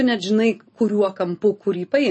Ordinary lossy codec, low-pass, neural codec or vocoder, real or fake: MP3, 32 kbps; 9.9 kHz; none; real